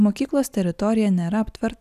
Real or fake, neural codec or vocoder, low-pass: real; none; 14.4 kHz